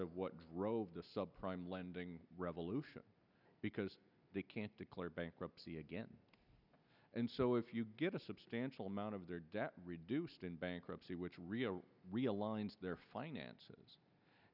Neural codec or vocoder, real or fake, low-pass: none; real; 5.4 kHz